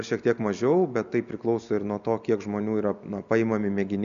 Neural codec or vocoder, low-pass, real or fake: none; 7.2 kHz; real